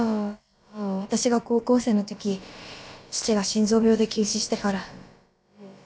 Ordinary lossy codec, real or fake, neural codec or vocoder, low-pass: none; fake; codec, 16 kHz, about 1 kbps, DyCAST, with the encoder's durations; none